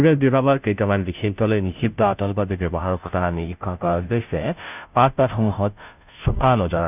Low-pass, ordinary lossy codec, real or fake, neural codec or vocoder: 3.6 kHz; none; fake; codec, 16 kHz, 0.5 kbps, FunCodec, trained on Chinese and English, 25 frames a second